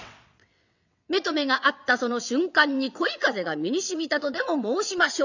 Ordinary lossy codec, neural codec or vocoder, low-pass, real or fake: none; vocoder, 22.05 kHz, 80 mel bands, WaveNeXt; 7.2 kHz; fake